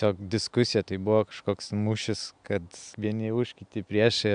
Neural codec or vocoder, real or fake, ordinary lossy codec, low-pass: none; real; MP3, 96 kbps; 9.9 kHz